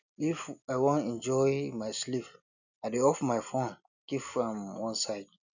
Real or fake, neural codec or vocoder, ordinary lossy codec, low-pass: real; none; none; 7.2 kHz